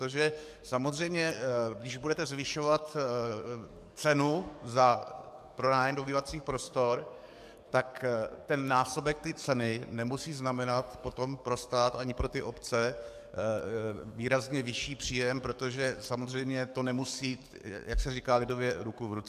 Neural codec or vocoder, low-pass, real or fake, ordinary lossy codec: codec, 44.1 kHz, 7.8 kbps, DAC; 14.4 kHz; fake; MP3, 96 kbps